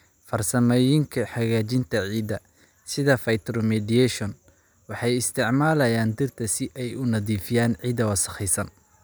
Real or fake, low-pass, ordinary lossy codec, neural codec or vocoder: real; none; none; none